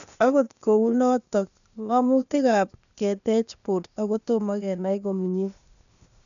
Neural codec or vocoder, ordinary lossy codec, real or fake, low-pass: codec, 16 kHz, 0.8 kbps, ZipCodec; none; fake; 7.2 kHz